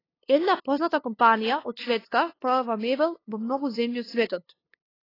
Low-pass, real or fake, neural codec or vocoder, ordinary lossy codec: 5.4 kHz; fake; codec, 16 kHz, 2 kbps, FunCodec, trained on LibriTTS, 25 frames a second; AAC, 24 kbps